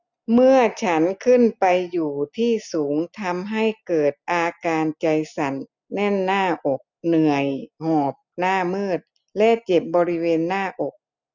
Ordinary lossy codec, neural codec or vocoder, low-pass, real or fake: none; none; 7.2 kHz; real